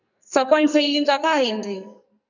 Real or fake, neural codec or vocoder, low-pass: fake; codec, 44.1 kHz, 2.6 kbps, SNAC; 7.2 kHz